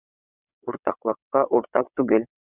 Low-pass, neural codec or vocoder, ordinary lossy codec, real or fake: 3.6 kHz; vocoder, 22.05 kHz, 80 mel bands, WaveNeXt; Opus, 64 kbps; fake